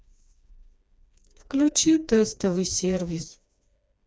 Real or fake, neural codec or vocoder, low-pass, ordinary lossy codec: fake; codec, 16 kHz, 2 kbps, FreqCodec, smaller model; none; none